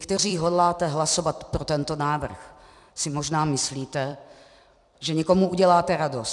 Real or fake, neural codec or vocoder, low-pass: fake; vocoder, 44.1 kHz, 128 mel bands every 256 samples, BigVGAN v2; 10.8 kHz